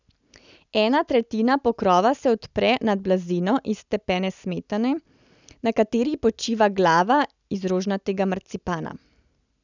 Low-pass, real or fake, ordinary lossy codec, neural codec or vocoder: 7.2 kHz; real; none; none